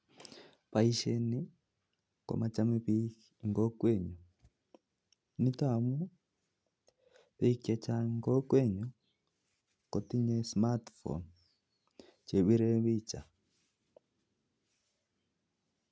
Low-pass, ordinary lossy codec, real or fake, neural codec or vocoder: none; none; real; none